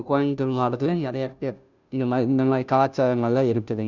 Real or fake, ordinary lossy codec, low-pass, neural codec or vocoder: fake; none; 7.2 kHz; codec, 16 kHz, 0.5 kbps, FunCodec, trained on Chinese and English, 25 frames a second